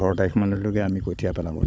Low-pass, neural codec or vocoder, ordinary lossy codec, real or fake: none; codec, 16 kHz, 16 kbps, FunCodec, trained on Chinese and English, 50 frames a second; none; fake